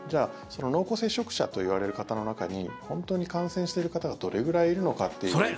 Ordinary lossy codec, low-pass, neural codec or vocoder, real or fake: none; none; none; real